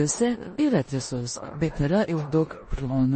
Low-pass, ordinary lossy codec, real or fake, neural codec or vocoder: 10.8 kHz; MP3, 32 kbps; fake; codec, 16 kHz in and 24 kHz out, 0.9 kbps, LongCat-Audio-Codec, four codebook decoder